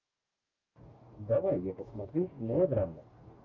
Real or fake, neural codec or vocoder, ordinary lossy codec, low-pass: fake; codec, 44.1 kHz, 2.6 kbps, DAC; Opus, 24 kbps; 7.2 kHz